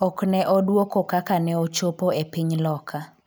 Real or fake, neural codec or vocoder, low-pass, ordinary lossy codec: real; none; none; none